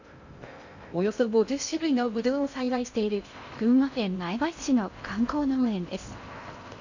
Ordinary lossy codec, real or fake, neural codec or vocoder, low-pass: none; fake; codec, 16 kHz in and 24 kHz out, 0.6 kbps, FocalCodec, streaming, 2048 codes; 7.2 kHz